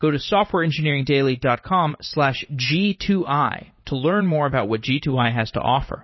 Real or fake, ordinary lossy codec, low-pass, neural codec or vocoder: fake; MP3, 24 kbps; 7.2 kHz; vocoder, 44.1 kHz, 80 mel bands, Vocos